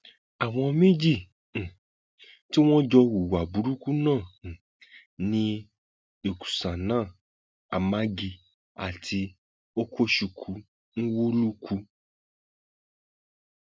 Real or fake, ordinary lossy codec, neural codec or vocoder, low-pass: real; none; none; none